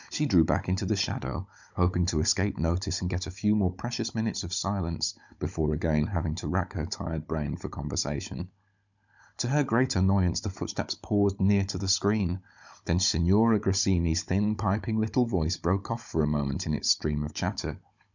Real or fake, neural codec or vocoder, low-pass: fake; codec, 16 kHz, 16 kbps, FunCodec, trained on Chinese and English, 50 frames a second; 7.2 kHz